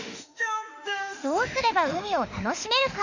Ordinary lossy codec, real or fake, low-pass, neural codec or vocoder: none; fake; 7.2 kHz; autoencoder, 48 kHz, 32 numbers a frame, DAC-VAE, trained on Japanese speech